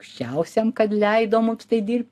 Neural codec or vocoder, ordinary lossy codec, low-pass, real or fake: none; AAC, 64 kbps; 14.4 kHz; real